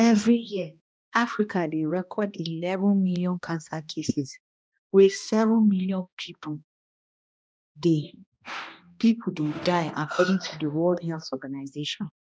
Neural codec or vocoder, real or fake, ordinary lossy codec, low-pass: codec, 16 kHz, 1 kbps, X-Codec, HuBERT features, trained on balanced general audio; fake; none; none